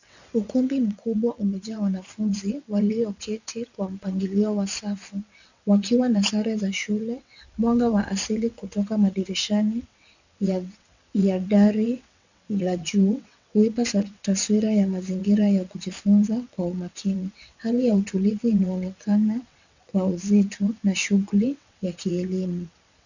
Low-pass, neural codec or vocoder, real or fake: 7.2 kHz; vocoder, 22.05 kHz, 80 mel bands, WaveNeXt; fake